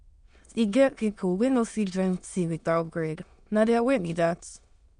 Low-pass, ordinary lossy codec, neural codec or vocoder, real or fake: 9.9 kHz; MP3, 64 kbps; autoencoder, 22.05 kHz, a latent of 192 numbers a frame, VITS, trained on many speakers; fake